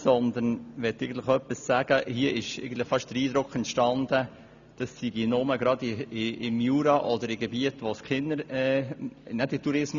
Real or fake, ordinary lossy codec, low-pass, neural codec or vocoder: real; none; 7.2 kHz; none